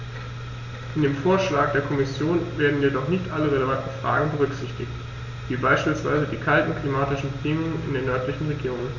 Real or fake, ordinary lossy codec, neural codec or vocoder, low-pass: real; Opus, 64 kbps; none; 7.2 kHz